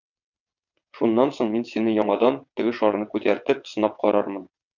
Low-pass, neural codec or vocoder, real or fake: 7.2 kHz; vocoder, 22.05 kHz, 80 mel bands, WaveNeXt; fake